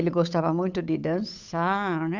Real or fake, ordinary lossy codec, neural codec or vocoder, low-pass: fake; none; codec, 16 kHz, 8 kbps, FunCodec, trained on Chinese and English, 25 frames a second; 7.2 kHz